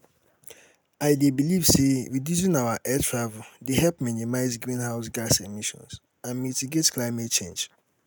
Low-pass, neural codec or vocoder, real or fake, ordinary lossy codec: none; none; real; none